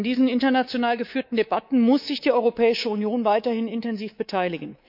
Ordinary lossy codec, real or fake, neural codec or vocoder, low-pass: none; fake; codec, 24 kHz, 3.1 kbps, DualCodec; 5.4 kHz